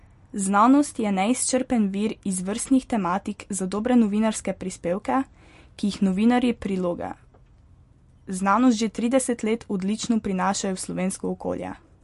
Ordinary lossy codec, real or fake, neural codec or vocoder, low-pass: MP3, 48 kbps; real; none; 14.4 kHz